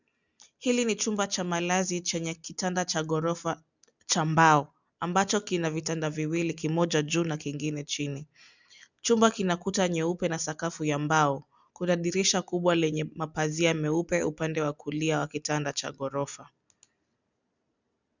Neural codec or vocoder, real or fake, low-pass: none; real; 7.2 kHz